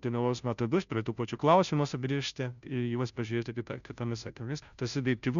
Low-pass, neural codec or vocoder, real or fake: 7.2 kHz; codec, 16 kHz, 0.5 kbps, FunCodec, trained on Chinese and English, 25 frames a second; fake